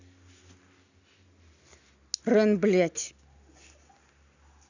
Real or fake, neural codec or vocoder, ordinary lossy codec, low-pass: real; none; none; 7.2 kHz